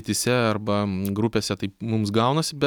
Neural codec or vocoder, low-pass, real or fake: none; 19.8 kHz; real